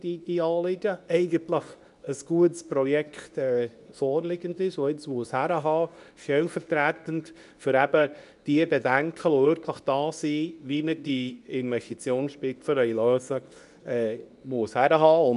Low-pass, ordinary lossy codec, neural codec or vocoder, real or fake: 10.8 kHz; none; codec, 24 kHz, 0.9 kbps, WavTokenizer, medium speech release version 2; fake